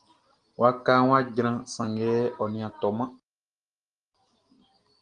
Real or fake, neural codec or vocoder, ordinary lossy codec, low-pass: real; none; Opus, 24 kbps; 9.9 kHz